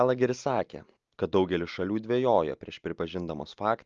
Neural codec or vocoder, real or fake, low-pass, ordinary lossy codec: none; real; 7.2 kHz; Opus, 32 kbps